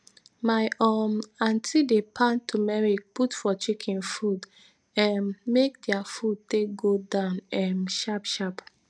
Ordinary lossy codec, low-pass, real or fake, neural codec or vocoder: none; 9.9 kHz; real; none